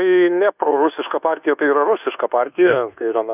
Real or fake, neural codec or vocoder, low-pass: fake; codec, 24 kHz, 1.2 kbps, DualCodec; 3.6 kHz